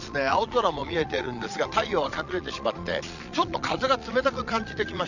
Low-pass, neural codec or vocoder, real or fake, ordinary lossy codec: 7.2 kHz; vocoder, 22.05 kHz, 80 mel bands, Vocos; fake; none